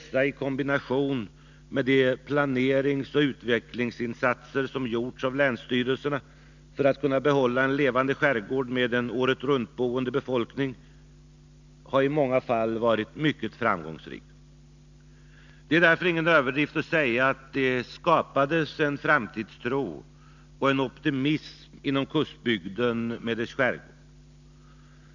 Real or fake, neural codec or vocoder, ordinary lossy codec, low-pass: real; none; none; 7.2 kHz